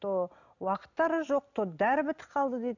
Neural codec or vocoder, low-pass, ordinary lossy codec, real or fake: none; 7.2 kHz; none; real